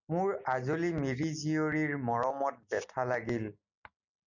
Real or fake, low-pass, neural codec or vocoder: real; 7.2 kHz; none